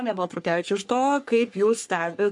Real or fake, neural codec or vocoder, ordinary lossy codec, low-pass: fake; codec, 44.1 kHz, 3.4 kbps, Pupu-Codec; MP3, 64 kbps; 10.8 kHz